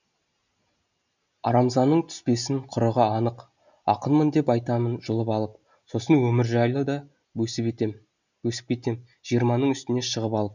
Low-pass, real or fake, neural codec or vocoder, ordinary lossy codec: 7.2 kHz; real; none; none